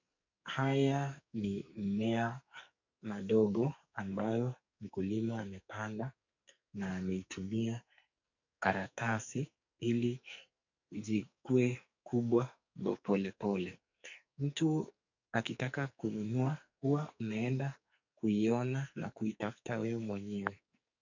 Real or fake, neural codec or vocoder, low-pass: fake; codec, 44.1 kHz, 2.6 kbps, SNAC; 7.2 kHz